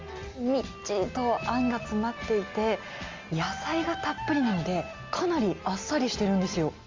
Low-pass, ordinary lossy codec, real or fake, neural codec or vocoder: 7.2 kHz; Opus, 32 kbps; real; none